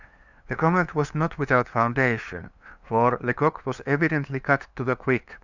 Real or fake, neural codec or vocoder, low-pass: fake; codec, 16 kHz, 2 kbps, FunCodec, trained on LibriTTS, 25 frames a second; 7.2 kHz